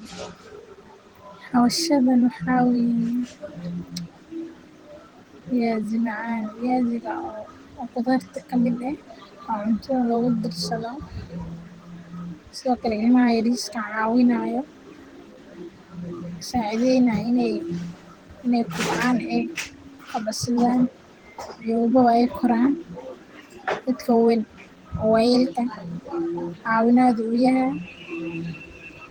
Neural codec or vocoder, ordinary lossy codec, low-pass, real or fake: vocoder, 44.1 kHz, 128 mel bands every 512 samples, BigVGAN v2; Opus, 16 kbps; 19.8 kHz; fake